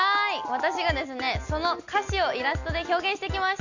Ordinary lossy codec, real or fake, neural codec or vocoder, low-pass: none; real; none; 7.2 kHz